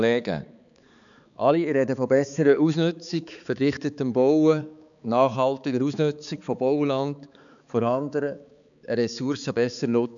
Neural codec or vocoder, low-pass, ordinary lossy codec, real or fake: codec, 16 kHz, 4 kbps, X-Codec, HuBERT features, trained on balanced general audio; 7.2 kHz; none; fake